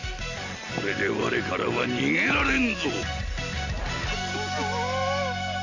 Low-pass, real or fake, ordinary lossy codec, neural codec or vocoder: 7.2 kHz; real; Opus, 64 kbps; none